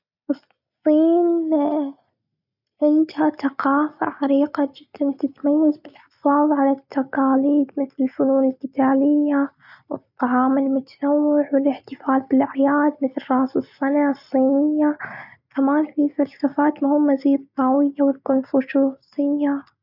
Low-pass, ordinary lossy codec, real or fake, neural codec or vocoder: 5.4 kHz; none; real; none